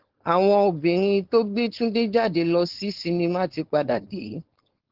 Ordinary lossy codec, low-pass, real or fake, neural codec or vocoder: Opus, 16 kbps; 5.4 kHz; fake; codec, 16 kHz, 4.8 kbps, FACodec